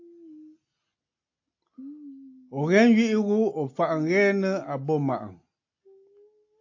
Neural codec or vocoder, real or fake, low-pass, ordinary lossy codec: none; real; 7.2 kHz; AAC, 48 kbps